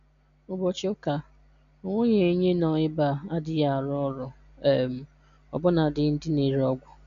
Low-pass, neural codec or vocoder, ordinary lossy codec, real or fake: 7.2 kHz; none; none; real